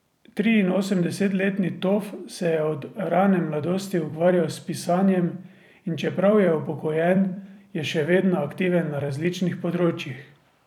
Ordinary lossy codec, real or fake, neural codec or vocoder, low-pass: none; fake; vocoder, 44.1 kHz, 128 mel bands every 256 samples, BigVGAN v2; 19.8 kHz